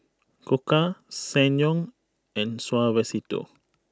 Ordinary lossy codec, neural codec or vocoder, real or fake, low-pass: none; none; real; none